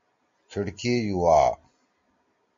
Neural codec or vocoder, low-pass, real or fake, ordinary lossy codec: none; 7.2 kHz; real; MP3, 48 kbps